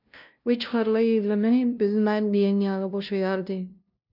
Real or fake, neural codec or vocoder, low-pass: fake; codec, 16 kHz, 0.5 kbps, FunCodec, trained on LibriTTS, 25 frames a second; 5.4 kHz